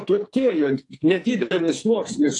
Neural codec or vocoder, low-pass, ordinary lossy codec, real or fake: codec, 44.1 kHz, 2.6 kbps, SNAC; 10.8 kHz; AAC, 48 kbps; fake